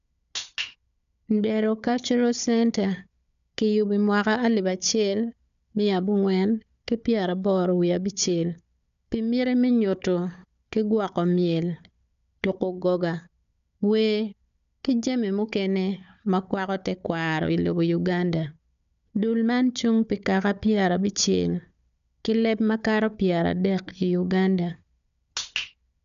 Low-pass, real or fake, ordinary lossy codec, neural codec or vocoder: 7.2 kHz; fake; none; codec, 16 kHz, 4 kbps, FunCodec, trained on Chinese and English, 50 frames a second